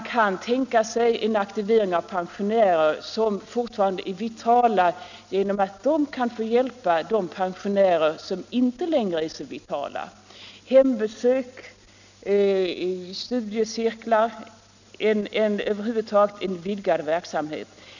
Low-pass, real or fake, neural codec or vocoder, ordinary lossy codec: 7.2 kHz; real; none; none